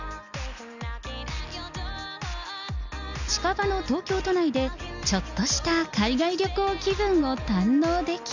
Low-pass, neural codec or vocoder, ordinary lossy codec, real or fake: 7.2 kHz; none; none; real